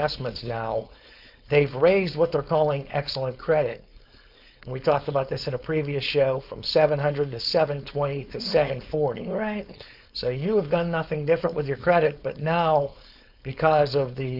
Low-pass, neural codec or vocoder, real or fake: 5.4 kHz; codec, 16 kHz, 4.8 kbps, FACodec; fake